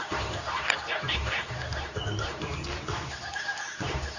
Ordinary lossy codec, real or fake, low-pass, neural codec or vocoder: none; fake; 7.2 kHz; codec, 24 kHz, 0.9 kbps, WavTokenizer, medium speech release version 2